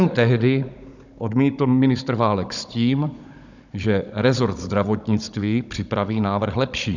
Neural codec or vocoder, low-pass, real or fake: codec, 16 kHz, 16 kbps, FunCodec, trained on Chinese and English, 50 frames a second; 7.2 kHz; fake